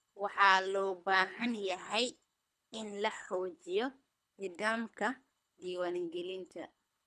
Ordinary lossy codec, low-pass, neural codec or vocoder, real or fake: none; none; codec, 24 kHz, 3 kbps, HILCodec; fake